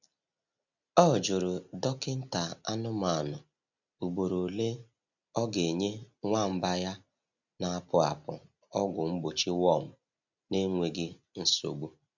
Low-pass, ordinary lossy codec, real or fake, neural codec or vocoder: 7.2 kHz; none; real; none